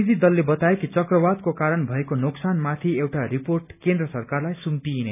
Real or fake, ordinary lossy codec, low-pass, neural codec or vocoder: real; none; 3.6 kHz; none